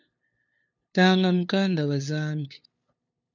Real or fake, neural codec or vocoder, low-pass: fake; codec, 16 kHz, 2 kbps, FunCodec, trained on LibriTTS, 25 frames a second; 7.2 kHz